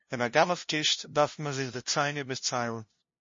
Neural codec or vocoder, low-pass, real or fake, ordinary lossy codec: codec, 16 kHz, 0.5 kbps, FunCodec, trained on LibriTTS, 25 frames a second; 7.2 kHz; fake; MP3, 32 kbps